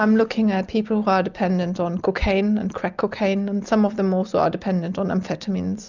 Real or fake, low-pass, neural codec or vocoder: real; 7.2 kHz; none